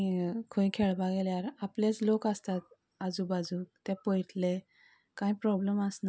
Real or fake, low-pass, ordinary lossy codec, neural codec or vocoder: real; none; none; none